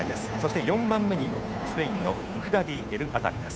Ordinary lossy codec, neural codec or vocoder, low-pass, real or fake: none; codec, 16 kHz, 2 kbps, FunCodec, trained on Chinese and English, 25 frames a second; none; fake